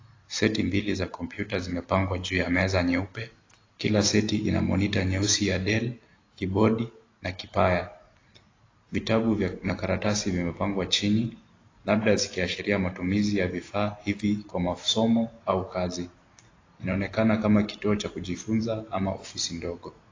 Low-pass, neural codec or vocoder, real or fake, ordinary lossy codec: 7.2 kHz; none; real; AAC, 32 kbps